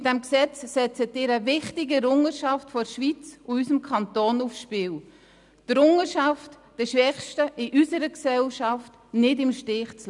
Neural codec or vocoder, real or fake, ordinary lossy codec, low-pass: none; real; none; 10.8 kHz